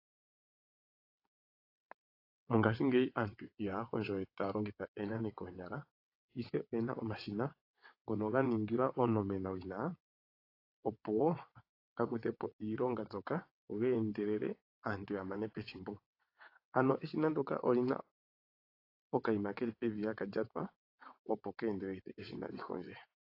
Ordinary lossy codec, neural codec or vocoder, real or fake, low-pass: AAC, 32 kbps; vocoder, 22.05 kHz, 80 mel bands, WaveNeXt; fake; 5.4 kHz